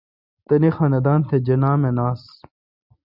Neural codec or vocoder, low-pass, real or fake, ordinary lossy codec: none; 5.4 kHz; real; Opus, 64 kbps